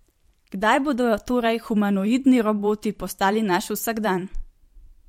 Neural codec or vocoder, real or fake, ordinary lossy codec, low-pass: vocoder, 44.1 kHz, 128 mel bands every 512 samples, BigVGAN v2; fake; MP3, 64 kbps; 19.8 kHz